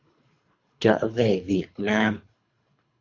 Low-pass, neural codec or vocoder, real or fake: 7.2 kHz; codec, 24 kHz, 3 kbps, HILCodec; fake